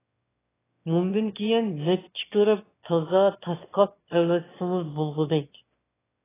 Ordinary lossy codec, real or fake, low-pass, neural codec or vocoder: AAC, 16 kbps; fake; 3.6 kHz; autoencoder, 22.05 kHz, a latent of 192 numbers a frame, VITS, trained on one speaker